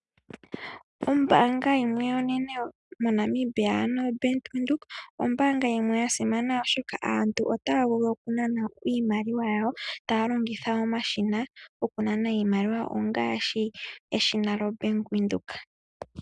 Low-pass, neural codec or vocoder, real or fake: 10.8 kHz; none; real